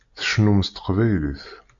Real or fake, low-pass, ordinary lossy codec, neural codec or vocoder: real; 7.2 kHz; AAC, 64 kbps; none